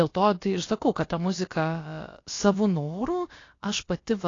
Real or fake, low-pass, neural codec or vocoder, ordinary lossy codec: fake; 7.2 kHz; codec, 16 kHz, about 1 kbps, DyCAST, with the encoder's durations; AAC, 32 kbps